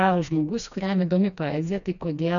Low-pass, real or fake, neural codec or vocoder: 7.2 kHz; fake; codec, 16 kHz, 2 kbps, FreqCodec, smaller model